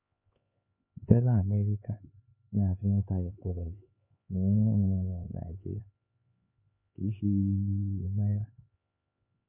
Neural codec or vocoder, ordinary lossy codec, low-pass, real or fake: codec, 16 kHz, 4 kbps, X-Codec, HuBERT features, trained on LibriSpeech; MP3, 32 kbps; 3.6 kHz; fake